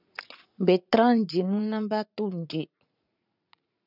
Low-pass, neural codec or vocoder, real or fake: 5.4 kHz; vocoder, 44.1 kHz, 80 mel bands, Vocos; fake